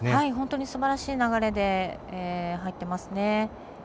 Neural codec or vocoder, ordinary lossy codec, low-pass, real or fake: none; none; none; real